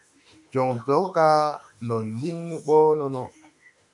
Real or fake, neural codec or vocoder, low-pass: fake; autoencoder, 48 kHz, 32 numbers a frame, DAC-VAE, trained on Japanese speech; 10.8 kHz